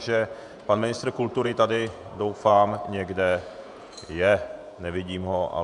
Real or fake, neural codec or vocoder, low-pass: fake; vocoder, 48 kHz, 128 mel bands, Vocos; 10.8 kHz